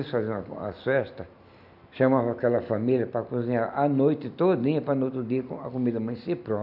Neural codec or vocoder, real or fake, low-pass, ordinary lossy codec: none; real; 5.4 kHz; none